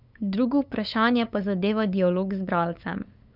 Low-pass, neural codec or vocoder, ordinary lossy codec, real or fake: 5.4 kHz; codec, 16 kHz, 8 kbps, FunCodec, trained on LibriTTS, 25 frames a second; none; fake